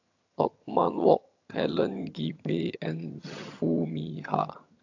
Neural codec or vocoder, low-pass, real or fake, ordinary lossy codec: vocoder, 22.05 kHz, 80 mel bands, HiFi-GAN; 7.2 kHz; fake; AAC, 48 kbps